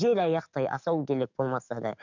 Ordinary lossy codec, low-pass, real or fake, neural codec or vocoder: none; 7.2 kHz; fake; codec, 44.1 kHz, 7.8 kbps, DAC